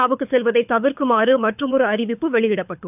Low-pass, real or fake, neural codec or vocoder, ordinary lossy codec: 3.6 kHz; fake; codec, 24 kHz, 6 kbps, HILCodec; none